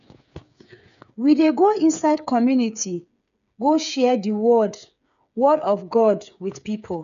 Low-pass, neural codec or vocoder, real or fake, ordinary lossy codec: 7.2 kHz; codec, 16 kHz, 8 kbps, FreqCodec, smaller model; fake; none